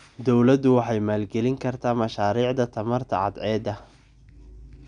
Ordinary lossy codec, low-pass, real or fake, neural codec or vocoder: none; 9.9 kHz; real; none